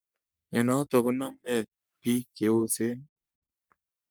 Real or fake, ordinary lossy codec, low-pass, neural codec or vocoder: fake; none; none; codec, 44.1 kHz, 3.4 kbps, Pupu-Codec